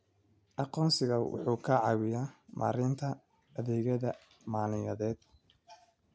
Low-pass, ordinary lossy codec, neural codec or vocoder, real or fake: none; none; none; real